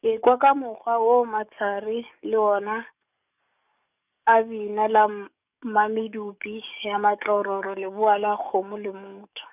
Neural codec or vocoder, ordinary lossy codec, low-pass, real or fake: codec, 44.1 kHz, 7.8 kbps, DAC; none; 3.6 kHz; fake